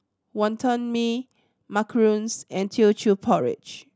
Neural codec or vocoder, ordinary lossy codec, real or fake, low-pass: none; none; real; none